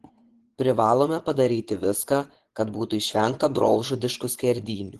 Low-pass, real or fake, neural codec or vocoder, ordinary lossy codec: 10.8 kHz; fake; vocoder, 24 kHz, 100 mel bands, Vocos; Opus, 16 kbps